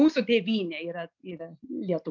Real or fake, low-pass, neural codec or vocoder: real; 7.2 kHz; none